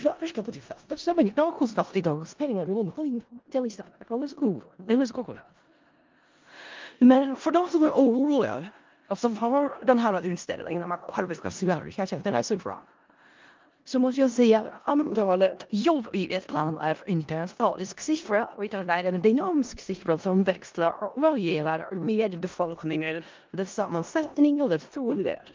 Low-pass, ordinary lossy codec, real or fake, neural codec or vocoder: 7.2 kHz; Opus, 32 kbps; fake; codec, 16 kHz in and 24 kHz out, 0.4 kbps, LongCat-Audio-Codec, four codebook decoder